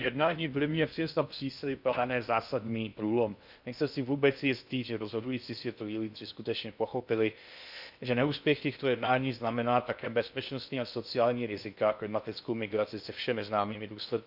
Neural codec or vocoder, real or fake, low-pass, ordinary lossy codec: codec, 16 kHz in and 24 kHz out, 0.6 kbps, FocalCodec, streaming, 2048 codes; fake; 5.4 kHz; none